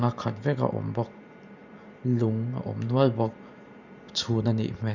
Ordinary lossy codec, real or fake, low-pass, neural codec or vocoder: none; fake; 7.2 kHz; vocoder, 44.1 kHz, 128 mel bands every 512 samples, BigVGAN v2